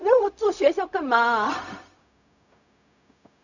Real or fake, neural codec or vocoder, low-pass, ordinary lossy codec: fake; codec, 16 kHz, 0.4 kbps, LongCat-Audio-Codec; 7.2 kHz; none